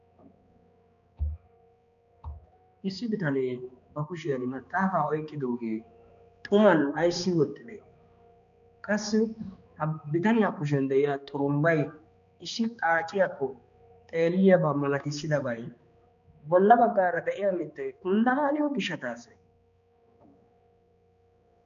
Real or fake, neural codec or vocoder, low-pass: fake; codec, 16 kHz, 2 kbps, X-Codec, HuBERT features, trained on balanced general audio; 7.2 kHz